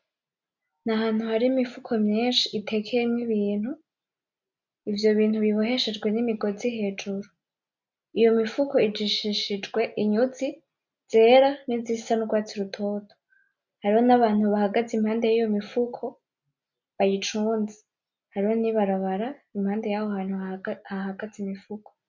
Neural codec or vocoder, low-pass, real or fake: none; 7.2 kHz; real